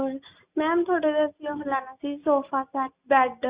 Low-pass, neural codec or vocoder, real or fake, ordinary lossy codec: 3.6 kHz; none; real; Opus, 64 kbps